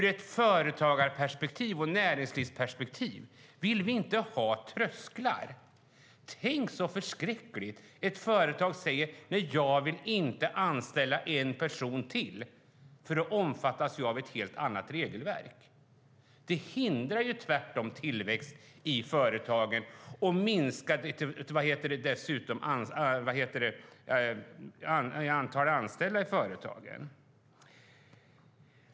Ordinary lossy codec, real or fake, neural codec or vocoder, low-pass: none; real; none; none